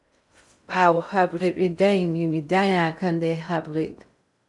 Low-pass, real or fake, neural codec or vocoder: 10.8 kHz; fake; codec, 16 kHz in and 24 kHz out, 0.6 kbps, FocalCodec, streaming, 2048 codes